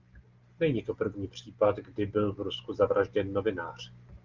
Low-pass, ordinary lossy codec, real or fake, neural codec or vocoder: 7.2 kHz; Opus, 32 kbps; real; none